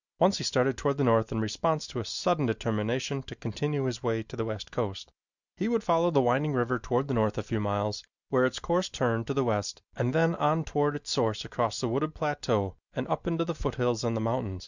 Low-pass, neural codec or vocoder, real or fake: 7.2 kHz; none; real